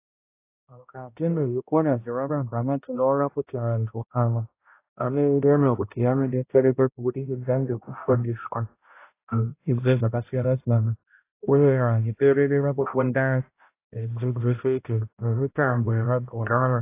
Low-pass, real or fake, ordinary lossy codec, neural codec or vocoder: 3.6 kHz; fake; AAC, 24 kbps; codec, 16 kHz, 0.5 kbps, X-Codec, HuBERT features, trained on balanced general audio